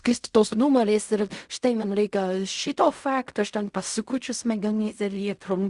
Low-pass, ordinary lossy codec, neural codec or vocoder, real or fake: 10.8 kHz; Opus, 64 kbps; codec, 16 kHz in and 24 kHz out, 0.4 kbps, LongCat-Audio-Codec, fine tuned four codebook decoder; fake